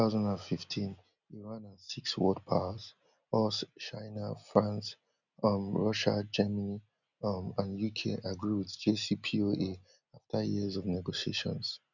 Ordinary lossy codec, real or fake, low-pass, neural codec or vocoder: none; real; 7.2 kHz; none